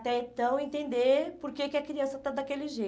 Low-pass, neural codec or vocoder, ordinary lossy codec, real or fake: none; none; none; real